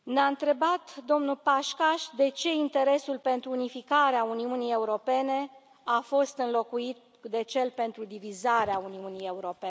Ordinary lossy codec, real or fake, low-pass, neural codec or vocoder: none; real; none; none